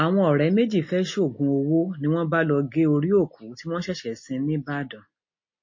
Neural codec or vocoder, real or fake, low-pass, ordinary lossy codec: none; real; 7.2 kHz; MP3, 32 kbps